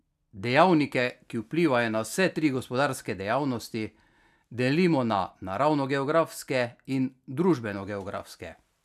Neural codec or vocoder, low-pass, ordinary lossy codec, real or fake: none; 14.4 kHz; none; real